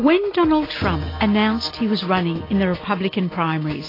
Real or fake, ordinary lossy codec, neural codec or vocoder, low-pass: real; AAC, 24 kbps; none; 5.4 kHz